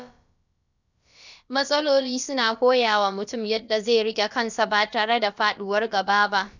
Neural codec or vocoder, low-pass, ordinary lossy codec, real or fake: codec, 16 kHz, about 1 kbps, DyCAST, with the encoder's durations; 7.2 kHz; none; fake